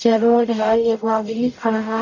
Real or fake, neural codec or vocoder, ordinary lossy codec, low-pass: fake; codec, 44.1 kHz, 0.9 kbps, DAC; none; 7.2 kHz